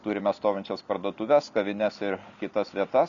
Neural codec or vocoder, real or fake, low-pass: none; real; 7.2 kHz